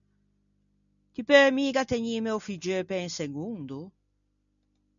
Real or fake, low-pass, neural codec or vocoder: real; 7.2 kHz; none